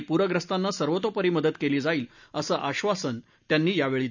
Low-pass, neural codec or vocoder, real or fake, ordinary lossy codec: 7.2 kHz; none; real; none